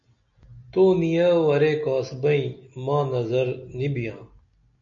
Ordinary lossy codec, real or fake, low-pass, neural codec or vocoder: MP3, 96 kbps; real; 7.2 kHz; none